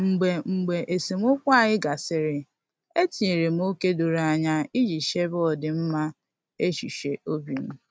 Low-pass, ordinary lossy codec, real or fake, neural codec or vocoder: none; none; real; none